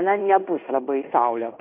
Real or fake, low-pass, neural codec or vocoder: fake; 3.6 kHz; codec, 16 kHz in and 24 kHz out, 0.9 kbps, LongCat-Audio-Codec, fine tuned four codebook decoder